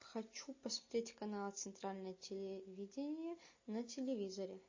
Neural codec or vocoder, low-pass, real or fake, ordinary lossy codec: none; 7.2 kHz; real; MP3, 32 kbps